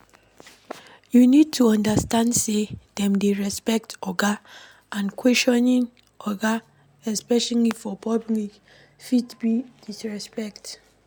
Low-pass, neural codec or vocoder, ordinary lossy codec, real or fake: none; none; none; real